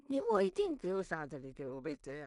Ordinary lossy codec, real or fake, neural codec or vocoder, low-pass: none; fake; codec, 16 kHz in and 24 kHz out, 0.4 kbps, LongCat-Audio-Codec, two codebook decoder; 10.8 kHz